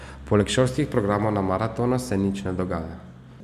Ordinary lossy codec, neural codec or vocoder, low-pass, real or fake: none; none; 14.4 kHz; real